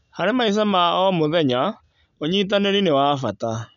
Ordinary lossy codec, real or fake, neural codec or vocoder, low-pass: none; real; none; 7.2 kHz